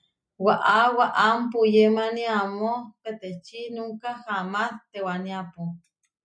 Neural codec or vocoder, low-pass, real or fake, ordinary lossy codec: none; 9.9 kHz; real; MP3, 96 kbps